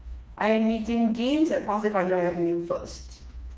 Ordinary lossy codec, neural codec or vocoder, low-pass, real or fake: none; codec, 16 kHz, 2 kbps, FreqCodec, smaller model; none; fake